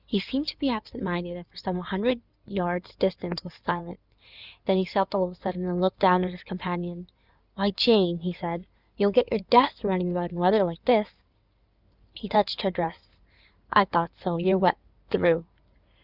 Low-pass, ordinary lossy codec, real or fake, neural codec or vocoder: 5.4 kHz; Opus, 64 kbps; fake; codec, 16 kHz in and 24 kHz out, 2.2 kbps, FireRedTTS-2 codec